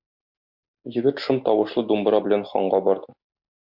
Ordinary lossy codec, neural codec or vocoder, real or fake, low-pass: MP3, 48 kbps; none; real; 5.4 kHz